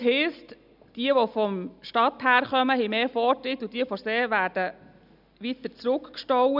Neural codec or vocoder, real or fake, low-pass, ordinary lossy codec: none; real; 5.4 kHz; none